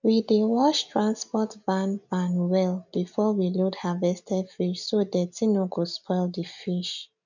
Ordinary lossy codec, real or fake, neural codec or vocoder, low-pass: none; real; none; 7.2 kHz